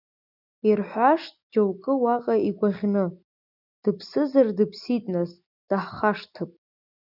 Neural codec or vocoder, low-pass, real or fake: none; 5.4 kHz; real